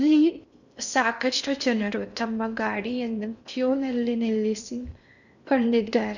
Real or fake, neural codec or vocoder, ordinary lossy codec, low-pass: fake; codec, 16 kHz in and 24 kHz out, 0.6 kbps, FocalCodec, streaming, 4096 codes; none; 7.2 kHz